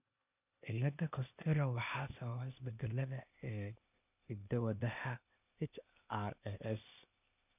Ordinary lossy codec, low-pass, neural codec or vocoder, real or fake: none; 3.6 kHz; codec, 16 kHz, 0.8 kbps, ZipCodec; fake